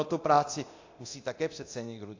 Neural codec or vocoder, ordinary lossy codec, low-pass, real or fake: codec, 24 kHz, 0.9 kbps, DualCodec; AAC, 48 kbps; 7.2 kHz; fake